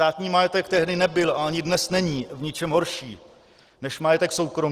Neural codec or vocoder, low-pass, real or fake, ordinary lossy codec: none; 14.4 kHz; real; Opus, 16 kbps